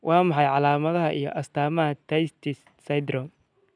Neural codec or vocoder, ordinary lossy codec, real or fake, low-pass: none; none; real; 9.9 kHz